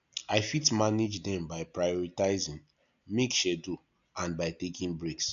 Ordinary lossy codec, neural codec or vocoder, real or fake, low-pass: none; none; real; 7.2 kHz